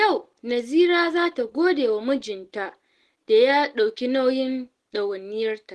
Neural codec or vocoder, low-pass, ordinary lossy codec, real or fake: none; 10.8 kHz; Opus, 16 kbps; real